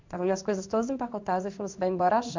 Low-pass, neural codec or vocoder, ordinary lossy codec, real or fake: 7.2 kHz; codec, 16 kHz in and 24 kHz out, 1 kbps, XY-Tokenizer; MP3, 64 kbps; fake